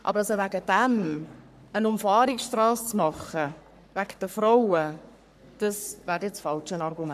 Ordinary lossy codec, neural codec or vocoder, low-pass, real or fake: none; codec, 44.1 kHz, 3.4 kbps, Pupu-Codec; 14.4 kHz; fake